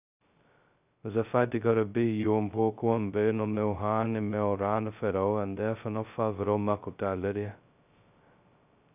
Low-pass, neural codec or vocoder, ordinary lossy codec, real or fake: 3.6 kHz; codec, 16 kHz, 0.2 kbps, FocalCodec; none; fake